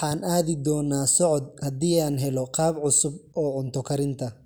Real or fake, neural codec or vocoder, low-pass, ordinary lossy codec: real; none; none; none